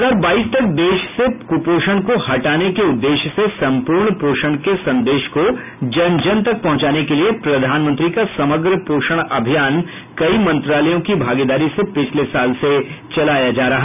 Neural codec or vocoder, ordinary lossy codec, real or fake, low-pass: none; none; real; 3.6 kHz